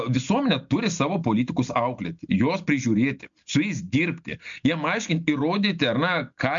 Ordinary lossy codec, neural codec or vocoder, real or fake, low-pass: MP3, 64 kbps; none; real; 7.2 kHz